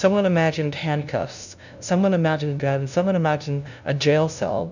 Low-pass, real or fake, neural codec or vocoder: 7.2 kHz; fake; codec, 16 kHz, 0.5 kbps, FunCodec, trained on LibriTTS, 25 frames a second